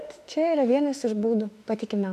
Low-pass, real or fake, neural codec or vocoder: 14.4 kHz; fake; autoencoder, 48 kHz, 32 numbers a frame, DAC-VAE, trained on Japanese speech